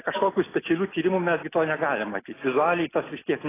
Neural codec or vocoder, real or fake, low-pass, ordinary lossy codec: none; real; 3.6 kHz; AAC, 16 kbps